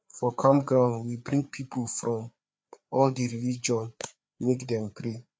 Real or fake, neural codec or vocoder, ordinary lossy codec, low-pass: fake; codec, 16 kHz, 4 kbps, FreqCodec, larger model; none; none